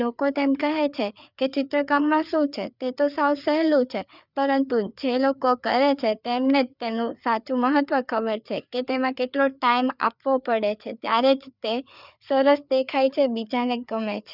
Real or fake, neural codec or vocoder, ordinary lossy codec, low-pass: fake; codec, 16 kHz, 4 kbps, FreqCodec, larger model; none; 5.4 kHz